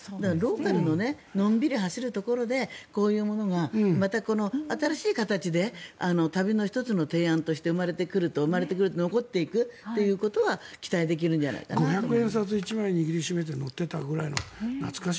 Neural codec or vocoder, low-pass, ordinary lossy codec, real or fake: none; none; none; real